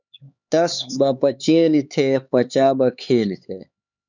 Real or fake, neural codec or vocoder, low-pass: fake; codec, 16 kHz, 4 kbps, X-Codec, HuBERT features, trained on LibriSpeech; 7.2 kHz